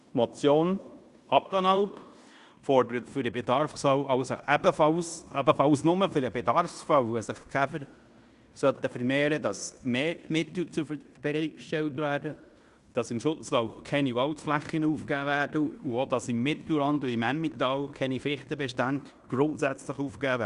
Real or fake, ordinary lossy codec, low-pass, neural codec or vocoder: fake; Opus, 64 kbps; 10.8 kHz; codec, 16 kHz in and 24 kHz out, 0.9 kbps, LongCat-Audio-Codec, fine tuned four codebook decoder